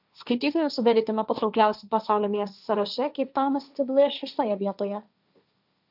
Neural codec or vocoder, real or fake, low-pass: codec, 16 kHz, 1.1 kbps, Voila-Tokenizer; fake; 5.4 kHz